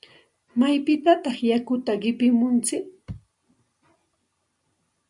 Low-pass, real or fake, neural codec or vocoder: 10.8 kHz; real; none